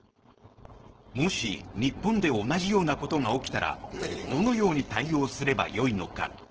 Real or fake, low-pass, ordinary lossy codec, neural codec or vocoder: fake; 7.2 kHz; Opus, 16 kbps; codec, 16 kHz, 4.8 kbps, FACodec